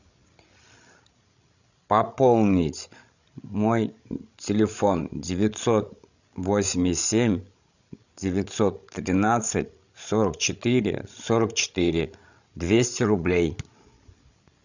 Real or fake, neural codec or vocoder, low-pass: fake; codec, 16 kHz, 16 kbps, FreqCodec, larger model; 7.2 kHz